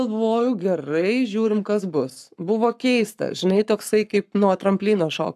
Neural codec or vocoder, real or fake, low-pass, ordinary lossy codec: codec, 44.1 kHz, 7.8 kbps, Pupu-Codec; fake; 14.4 kHz; AAC, 96 kbps